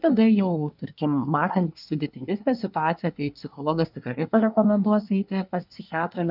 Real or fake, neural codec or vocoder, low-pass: fake; codec, 24 kHz, 1 kbps, SNAC; 5.4 kHz